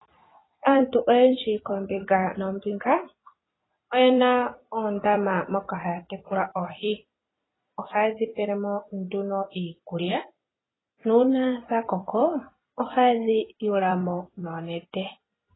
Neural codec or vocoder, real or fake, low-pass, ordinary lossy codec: vocoder, 44.1 kHz, 128 mel bands every 256 samples, BigVGAN v2; fake; 7.2 kHz; AAC, 16 kbps